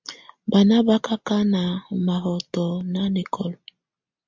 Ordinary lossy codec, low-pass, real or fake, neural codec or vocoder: MP3, 64 kbps; 7.2 kHz; real; none